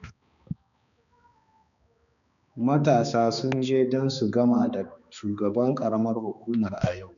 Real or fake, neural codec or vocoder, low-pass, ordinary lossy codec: fake; codec, 16 kHz, 2 kbps, X-Codec, HuBERT features, trained on balanced general audio; 7.2 kHz; none